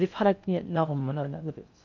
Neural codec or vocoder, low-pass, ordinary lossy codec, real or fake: codec, 16 kHz in and 24 kHz out, 0.6 kbps, FocalCodec, streaming, 4096 codes; 7.2 kHz; none; fake